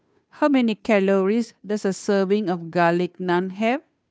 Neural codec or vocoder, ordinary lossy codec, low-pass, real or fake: codec, 16 kHz, 2 kbps, FunCodec, trained on Chinese and English, 25 frames a second; none; none; fake